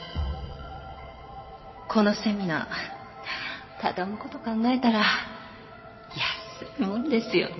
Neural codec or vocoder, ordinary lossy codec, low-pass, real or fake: vocoder, 22.05 kHz, 80 mel bands, WaveNeXt; MP3, 24 kbps; 7.2 kHz; fake